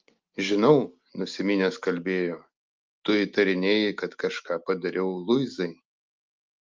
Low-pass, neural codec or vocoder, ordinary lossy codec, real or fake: 7.2 kHz; none; Opus, 24 kbps; real